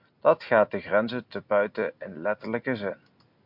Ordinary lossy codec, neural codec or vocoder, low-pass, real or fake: MP3, 48 kbps; none; 5.4 kHz; real